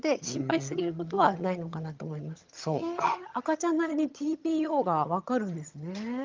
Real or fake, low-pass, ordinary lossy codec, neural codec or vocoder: fake; 7.2 kHz; Opus, 24 kbps; vocoder, 22.05 kHz, 80 mel bands, HiFi-GAN